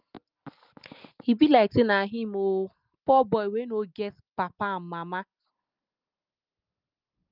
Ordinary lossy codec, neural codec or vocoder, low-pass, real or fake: Opus, 24 kbps; none; 5.4 kHz; real